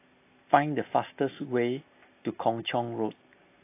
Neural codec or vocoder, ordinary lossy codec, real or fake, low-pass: none; AAC, 24 kbps; real; 3.6 kHz